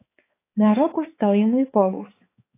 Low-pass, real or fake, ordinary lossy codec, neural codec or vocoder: 3.6 kHz; fake; AAC, 24 kbps; codec, 16 kHz, 4 kbps, X-Codec, HuBERT features, trained on balanced general audio